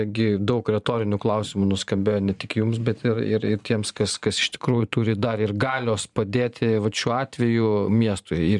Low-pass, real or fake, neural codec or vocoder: 10.8 kHz; fake; vocoder, 44.1 kHz, 128 mel bands every 512 samples, BigVGAN v2